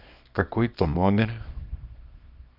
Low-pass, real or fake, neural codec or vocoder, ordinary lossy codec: 5.4 kHz; fake; codec, 24 kHz, 0.9 kbps, WavTokenizer, small release; none